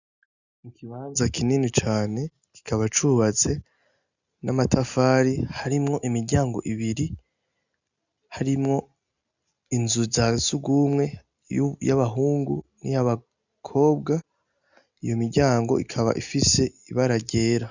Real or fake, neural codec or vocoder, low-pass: real; none; 7.2 kHz